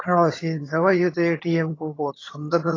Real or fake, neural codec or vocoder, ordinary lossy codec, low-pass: fake; vocoder, 22.05 kHz, 80 mel bands, HiFi-GAN; AAC, 32 kbps; 7.2 kHz